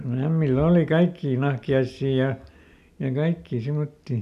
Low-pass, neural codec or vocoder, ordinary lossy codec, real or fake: 14.4 kHz; none; none; real